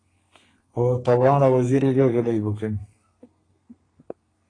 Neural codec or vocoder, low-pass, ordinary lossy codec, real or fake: codec, 32 kHz, 1.9 kbps, SNAC; 9.9 kHz; AAC, 32 kbps; fake